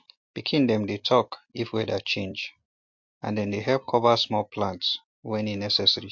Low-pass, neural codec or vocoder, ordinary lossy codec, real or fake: 7.2 kHz; none; MP3, 48 kbps; real